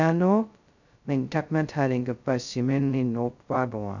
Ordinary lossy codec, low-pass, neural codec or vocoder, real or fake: none; 7.2 kHz; codec, 16 kHz, 0.2 kbps, FocalCodec; fake